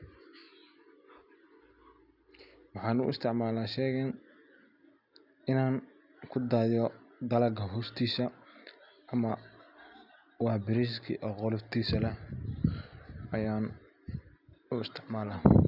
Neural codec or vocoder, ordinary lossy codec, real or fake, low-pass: none; none; real; 5.4 kHz